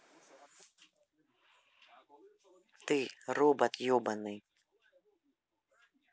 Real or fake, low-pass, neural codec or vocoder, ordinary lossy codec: real; none; none; none